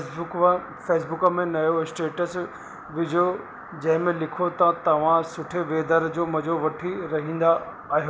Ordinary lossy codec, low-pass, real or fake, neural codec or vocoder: none; none; real; none